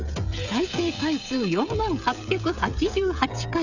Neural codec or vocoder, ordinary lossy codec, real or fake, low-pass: codec, 16 kHz, 16 kbps, FreqCodec, smaller model; none; fake; 7.2 kHz